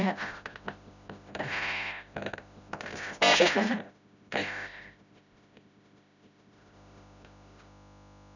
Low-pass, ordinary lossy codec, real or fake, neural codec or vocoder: 7.2 kHz; none; fake; codec, 16 kHz, 0.5 kbps, FreqCodec, smaller model